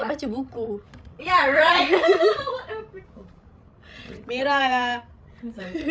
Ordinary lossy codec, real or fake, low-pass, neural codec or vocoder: none; fake; none; codec, 16 kHz, 16 kbps, FreqCodec, larger model